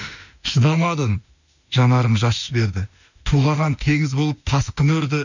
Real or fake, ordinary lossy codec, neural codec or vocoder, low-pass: fake; none; autoencoder, 48 kHz, 32 numbers a frame, DAC-VAE, trained on Japanese speech; 7.2 kHz